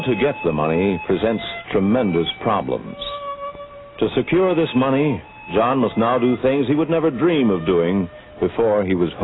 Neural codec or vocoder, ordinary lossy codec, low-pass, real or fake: none; AAC, 16 kbps; 7.2 kHz; real